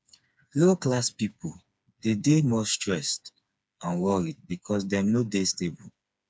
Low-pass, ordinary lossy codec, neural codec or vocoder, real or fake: none; none; codec, 16 kHz, 4 kbps, FreqCodec, smaller model; fake